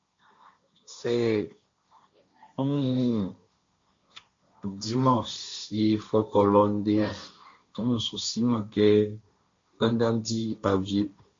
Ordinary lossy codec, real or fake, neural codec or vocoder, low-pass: MP3, 48 kbps; fake; codec, 16 kHz, 1.1 kbps, Voila-Tokenizer; 7.2 kHz